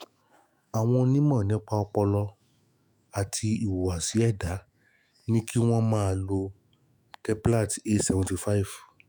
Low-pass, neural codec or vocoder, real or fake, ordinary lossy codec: none; autoencoder, 48 kHz, 128 numbers a frame, DAC-VAE, trained on Japanese speech; fake; none